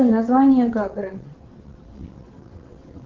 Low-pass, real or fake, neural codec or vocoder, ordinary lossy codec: 7.2 kHz; fake; vocoder, 22.05 kHz, 80 mel bands, WaveNeXt; Opus, 16 kbps